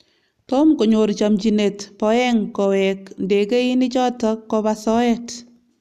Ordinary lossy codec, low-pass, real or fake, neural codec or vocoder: none; 14.4 kHz; real; none